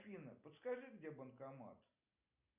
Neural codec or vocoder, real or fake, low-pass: none; real; 3.6 kHz